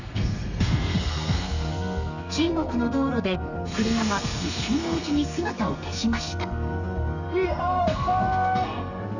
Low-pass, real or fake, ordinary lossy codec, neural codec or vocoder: 7.2 kHz; fake; none; codec, 32 kHz, 1.9 kbps, SNAC